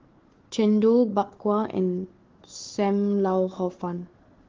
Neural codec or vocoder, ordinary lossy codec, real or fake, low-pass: codec, 16 kHz, 4 kbps, FunCodec, trained on Chinese and English, 50 frames a second; Opus, 16 kbps; fake; 7.2 kHz